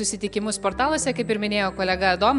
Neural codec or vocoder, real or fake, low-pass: none; real; 10.8 kHz